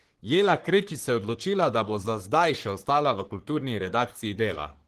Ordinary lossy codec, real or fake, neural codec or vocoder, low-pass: Opus, 16 kbps; fake; codec, 44.1 kHz, 3.4 kbps, Pupu-Codec; 14.4 kHz